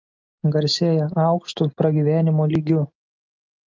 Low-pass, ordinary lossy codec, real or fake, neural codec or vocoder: 7.2 kHz; Opus, 24 kbps; real; none